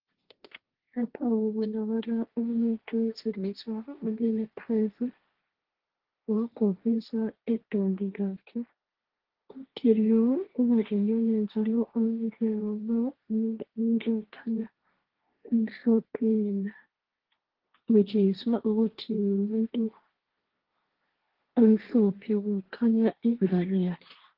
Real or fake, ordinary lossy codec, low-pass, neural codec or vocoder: fake; Opus, 16 kbps; 5.4 kHz; codec, 16 kHz, 1.1 kbps, Voila-Tokenizer